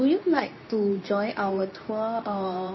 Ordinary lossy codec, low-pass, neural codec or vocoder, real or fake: MP3, 24 kbps; 7.2 kHz; codec, 24 kHz, 0.9 kbps, WavTokenizer, medium speech release version 2; fake